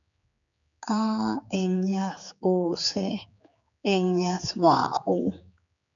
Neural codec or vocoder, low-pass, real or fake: codec, 16 kHz, 4 kbps, X-Codec, HuBERT features, trained on general audio; 7.2 kHz; fake